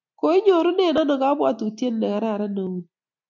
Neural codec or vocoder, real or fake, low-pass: none; real; 7.2 kHz